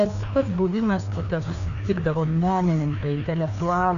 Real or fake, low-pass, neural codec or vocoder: fake; 7.2 kHz; codec, 16 kHz, 2 kbps, FreqCodec, larger model